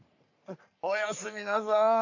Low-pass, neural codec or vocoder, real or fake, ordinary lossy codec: 7.2 kHz; codec, 44.1 kHz, 3.4 kbps, Pupu-Codec; fake; none